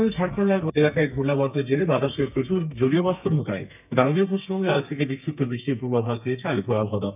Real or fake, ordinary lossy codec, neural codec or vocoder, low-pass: fake; none; codec, 32 kHz, 1.9 kbps, SNAC; 3.6 kHz